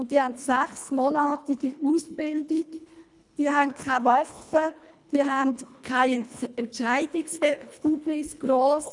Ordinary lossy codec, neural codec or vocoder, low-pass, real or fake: none; codec, 24 kHz, 1.5 kbps, HILCodec; 10.8 kHz; fake